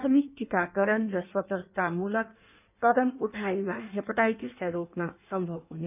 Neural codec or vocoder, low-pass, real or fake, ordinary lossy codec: codec, 16 kHz in and 24 kHz out, 1.1 kbps, FireRedTTS-2 codec; 3.6 kHz; fake; none